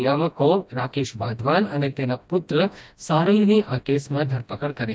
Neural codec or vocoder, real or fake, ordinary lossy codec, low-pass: codec, 16 kHz, 1 kbps, FreqCodec, smaller model; fake; none; none